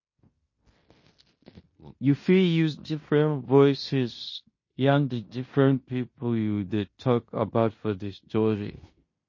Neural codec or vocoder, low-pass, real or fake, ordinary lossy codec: codec, 16 kHz in and 24 kHz out, 0.9 kbps, LongCat-Audio-Codec, fine tuned four codebook decoder; 7.2 kHz; fake; MP3, 32 kbps